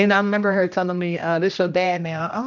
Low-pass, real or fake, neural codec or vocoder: 7.2 kHz; fake; codec, 16 kHz, 1 kbps, X-Codec, HuBERT features, trained on general audio